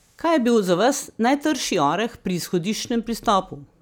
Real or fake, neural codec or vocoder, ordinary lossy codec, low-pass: real; none; none; none